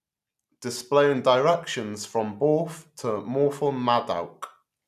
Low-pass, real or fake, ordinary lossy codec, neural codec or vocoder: 14.4 kHz; real; none; none